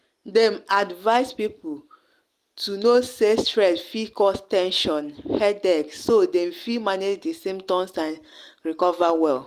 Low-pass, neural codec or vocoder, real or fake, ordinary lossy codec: 14.4 kHz; none; real; Opus, 24 kbps